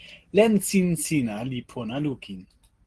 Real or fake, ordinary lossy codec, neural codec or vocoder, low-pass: real; Opus, 16 kbps; none; 10.8 kHz